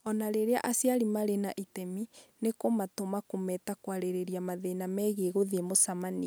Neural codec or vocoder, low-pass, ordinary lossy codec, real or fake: none; none; none; real